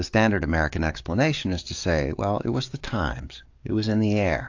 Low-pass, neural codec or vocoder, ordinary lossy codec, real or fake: 7.2 kHz; codec, 44.1 kHz, 7.8 kbps, DAC; AAC, 48 kbps; fake